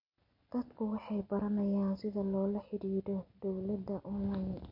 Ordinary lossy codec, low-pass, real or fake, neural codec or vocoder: MP3, 32 kbps; 5.4 kHz; real; none